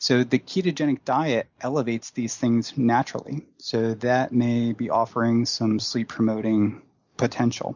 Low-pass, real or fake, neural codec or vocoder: 7.2 kHz; real; none